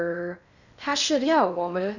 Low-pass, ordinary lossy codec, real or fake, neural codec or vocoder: 7.2 kHz; none; fake; codec, 16 kHz in and 24 kHz out, 0.6 kbps, FocalCodec, streaming, 4096 codes